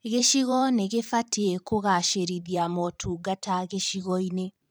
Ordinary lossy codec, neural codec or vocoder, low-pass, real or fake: none; vocoder, 44.1 kHz, 128 mel bands every 512 samples, BigVGAN v2; none; fake